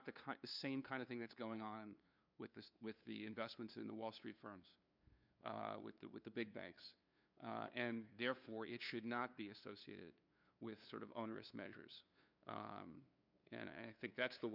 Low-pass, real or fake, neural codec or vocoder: 5.4 kHz; fake; codec, 16 kHz, 2 kbps, FunCodec, trained on LibriTTS, 25 frames a second